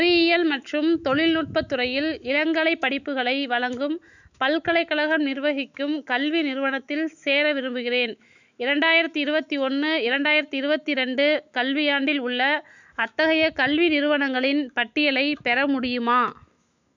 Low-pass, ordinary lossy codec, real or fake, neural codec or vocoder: 7.2 kHz; none; fake; autoencoder, 48 kHz, 128 numbers a frame, DAC-VAE, trained on Japanese speech